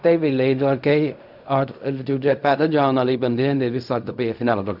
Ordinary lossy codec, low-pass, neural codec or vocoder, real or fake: none; 5.4 kHz; codec, 16 kHz in and 24 kHz out, 0.4 kbps, LongCat-Audio-Codec, fine tuned four codebook decoder; fake